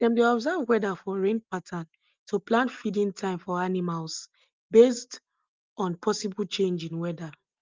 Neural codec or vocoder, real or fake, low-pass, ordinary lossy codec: none; real; 7.2 kHz; Opus, 32 kbps